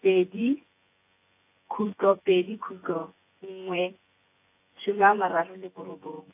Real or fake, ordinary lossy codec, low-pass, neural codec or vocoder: fake; AAC, 24 kbps; 3.6 kHz; vocoder, 24 kHz, 100 mel bands, Vocos